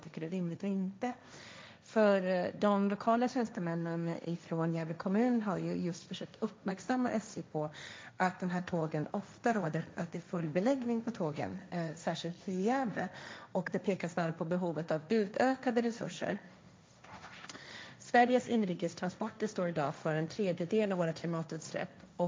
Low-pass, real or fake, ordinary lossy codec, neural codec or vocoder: none; fake; none; codec, 16 kHz, 1.1 kbps, Voila-Tokenizer